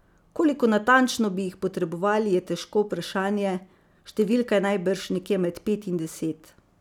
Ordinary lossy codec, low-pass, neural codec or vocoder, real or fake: none; 19.8 kHz; none; real